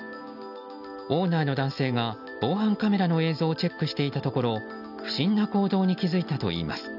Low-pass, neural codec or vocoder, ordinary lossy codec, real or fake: 5.4 kHz; none; none; real